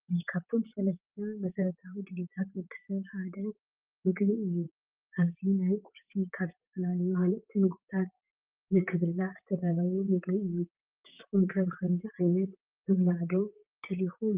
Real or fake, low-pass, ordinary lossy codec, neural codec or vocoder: fake; 3.6 kHz; Opus, 32 kbps; vocoder, 44.1 kHz, 128 mel bands, Pupu-Vocoder